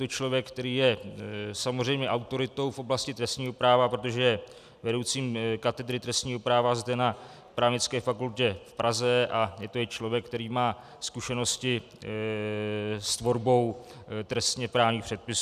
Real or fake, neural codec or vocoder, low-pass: real; none; 14.4 kHz